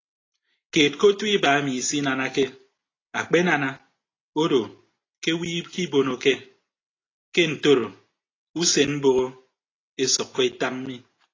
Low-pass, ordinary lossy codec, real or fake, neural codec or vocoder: 7.2 kHz; AAC, 32 kbps; real; none